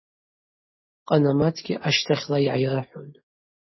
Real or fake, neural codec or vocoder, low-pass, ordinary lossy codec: real; none; 7.2 kHz; MP3, 24 kbps